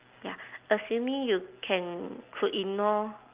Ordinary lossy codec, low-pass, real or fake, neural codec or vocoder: Opus, 24 kbps; 3.6 kHz; real; none